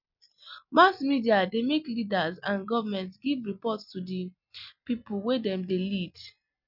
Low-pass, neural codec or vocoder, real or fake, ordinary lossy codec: 5.4 kHz; none; real; none